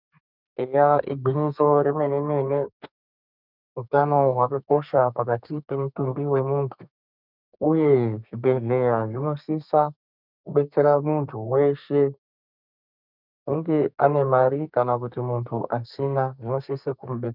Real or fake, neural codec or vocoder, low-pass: fake; codec, 32 kHz, 1.9 kbps, SNAC; 5.4 kHz